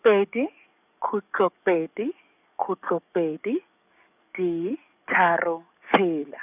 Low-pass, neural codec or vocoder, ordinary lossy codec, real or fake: 3.6 kHz; codec, 44.1 kHz, 7.8 kbps, DAC; none; fake